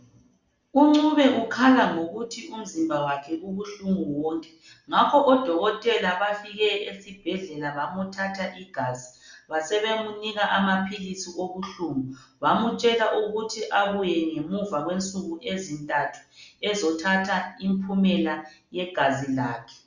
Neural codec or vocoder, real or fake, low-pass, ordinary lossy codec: none; real; 7.2 kHz; Opus, 64 kbps